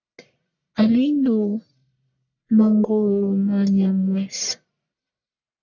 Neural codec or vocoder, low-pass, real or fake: codec, 44.1 kHz, 1.7 kbps, Pupu-Codec; 7.2 kHz; fake